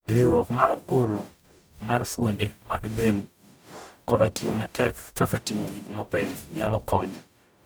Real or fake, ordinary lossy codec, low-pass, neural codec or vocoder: fake; none; none; codec, 44.1 kHz, 0.9 kbps, DAC